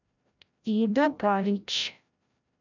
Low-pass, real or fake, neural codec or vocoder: 7.2 kHz; fake; codec, 16 kHz, 0.5 kbps, FreqCodec, larger model